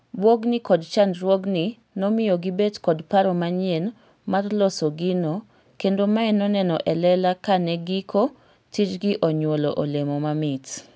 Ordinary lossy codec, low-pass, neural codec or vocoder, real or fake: none; none; none; real